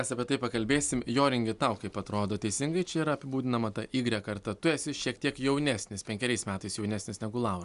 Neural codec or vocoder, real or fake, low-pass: none; real; 10.8 kHz